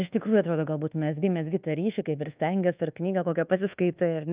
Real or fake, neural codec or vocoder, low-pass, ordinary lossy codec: fake; codec, 24 kHz, 1.2 kbps, DualCodec; 3.6 kHz; Opus, 24 kbps